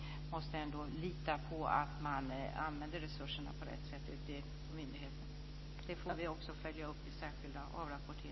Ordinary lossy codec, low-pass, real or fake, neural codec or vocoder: MP3, 24 kbps; 7.2 kHz; real; none